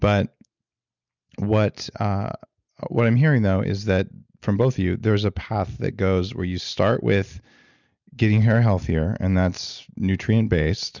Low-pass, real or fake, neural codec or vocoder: 7.2 kHz; real; none